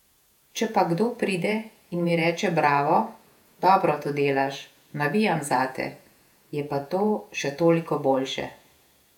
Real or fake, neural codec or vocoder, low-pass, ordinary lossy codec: fake; vocoder, 48 kHz, 128 mel bands, Vocos; 19.8 kHz; none